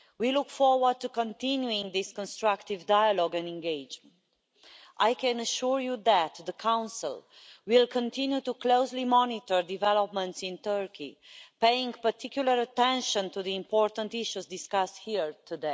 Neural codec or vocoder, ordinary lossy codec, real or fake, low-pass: none; none; real; none